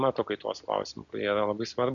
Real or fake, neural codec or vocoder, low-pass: real; none; 7.2 kHz